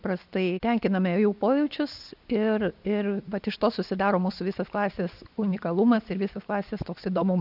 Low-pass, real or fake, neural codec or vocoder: 5.4 kHz; real; none